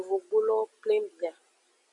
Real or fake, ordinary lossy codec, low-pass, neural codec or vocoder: real; MP3, 96 kbps; 10.8 kHz; none